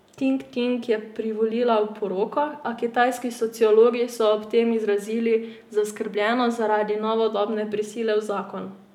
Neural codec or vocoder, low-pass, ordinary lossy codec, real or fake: none; 19.8 kHz; none; real